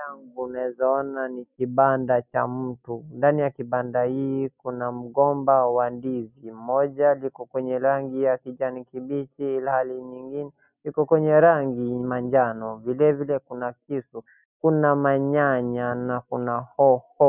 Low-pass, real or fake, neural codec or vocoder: 3.6 kHz; real; none